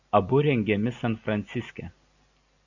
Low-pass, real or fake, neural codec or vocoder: 7.2 kHz; real; none